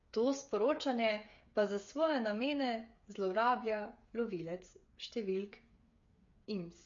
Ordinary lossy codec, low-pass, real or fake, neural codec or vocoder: MP3, 48 kbps; 7.2 kHz; fake; codec, 16 kHz, 8 kbps, FreqCodec, smaller model